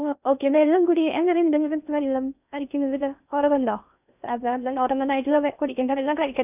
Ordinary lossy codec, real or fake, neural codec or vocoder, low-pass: none; fake; codec, 16 kHz in and 24 kHz out, 0.6 kbps, FocalCodec, streaming, 4096 codes; 3.6 kHz